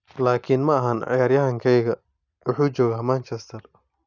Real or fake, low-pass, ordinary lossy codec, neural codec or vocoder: real; 7.2 kHz; none; none